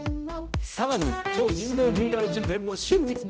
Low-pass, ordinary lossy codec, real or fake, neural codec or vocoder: none; none; fake; codec, 16 kHz, 0.5 kbps, X-Codec, HuBERT features, trained on general audio